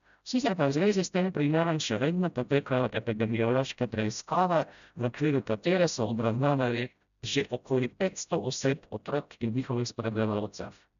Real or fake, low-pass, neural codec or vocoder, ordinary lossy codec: fake; 7.2 kHz; codec, 16 kHz, 0.5 kbps, FreqCodec, smaller model; none